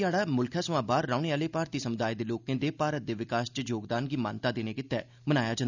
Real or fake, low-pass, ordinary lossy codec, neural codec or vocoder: real; 7.2 kHz; none; none